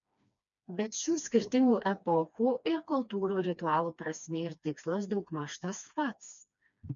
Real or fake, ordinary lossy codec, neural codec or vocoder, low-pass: fake; MP3, 64 kbps; codec, 16 kHz, 2 kbps, FreqCodec, smaller model; 7.2 kHz